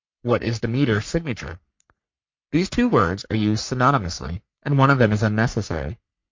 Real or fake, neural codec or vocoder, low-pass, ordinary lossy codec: fake; codec, 44.1 kHz, 3.4 kbps, Pupu-Codec; 7.2 kHz; MP3, 48 kbps